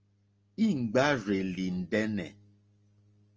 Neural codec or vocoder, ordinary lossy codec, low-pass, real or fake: none; Opus, 24 kbps; 7.2 kHz; real